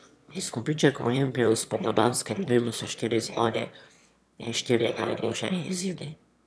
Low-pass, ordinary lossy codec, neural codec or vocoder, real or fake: none; none; autoencoder, 22.05 kHz, a latent of 192 numbers a frame, VITS, trained on one speaker; fake